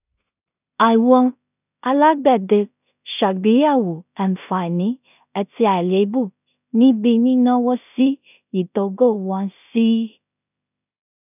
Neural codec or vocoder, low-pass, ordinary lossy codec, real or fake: codec, 16 kHz in and 24 kHz out, 0.4 kbps, LongCat-Audio-Codec, two codebook decoder; 3.6 kHz; none; fake